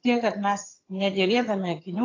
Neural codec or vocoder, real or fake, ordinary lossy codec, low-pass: vocoder, 22.05 kHz, 80 mel bands, HiFi-GAN; fake; AAC, 32 kbps; 7.2 kHz